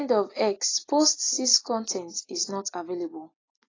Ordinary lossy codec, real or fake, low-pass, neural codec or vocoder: AAC, 32 kbps; real; 7.2 kHz; none